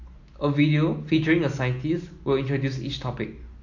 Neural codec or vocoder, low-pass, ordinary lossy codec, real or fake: none; 7.2 kHz; AAC, 48 kbps; real